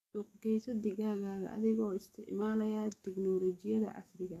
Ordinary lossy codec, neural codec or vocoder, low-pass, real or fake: AAC, 64 kbps; codec, 44.1 kHz, 7.8 kbps, DAC; 14.4 kHz; fake